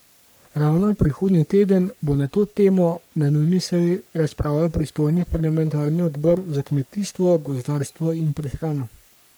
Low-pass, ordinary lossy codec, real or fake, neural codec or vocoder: none; none; fake; codec, 44.1 kHz, 3.4 kbps, Pupu-Codec